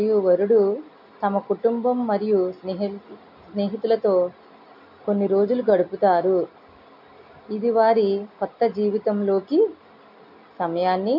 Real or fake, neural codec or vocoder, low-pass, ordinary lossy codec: real; none; 5.4 kHz; MP3, 48 kbps